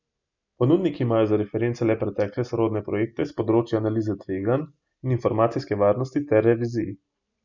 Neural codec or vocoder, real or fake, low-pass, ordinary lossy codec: none; real; 7.2 kHz; none